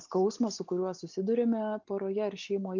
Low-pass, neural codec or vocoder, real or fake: 7.2 kHz; none; real